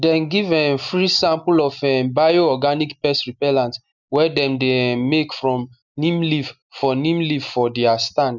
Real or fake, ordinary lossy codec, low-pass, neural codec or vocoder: real; none; 7.2 kHz; none